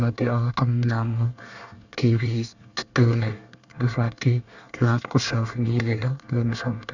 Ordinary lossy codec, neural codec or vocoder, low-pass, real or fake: none; codec, 24 kHz, 1 kbps, SNAC; 7.2 kHz; fake